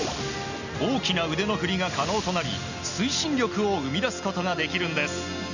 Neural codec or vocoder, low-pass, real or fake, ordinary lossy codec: none; 7.2 kHz; real; none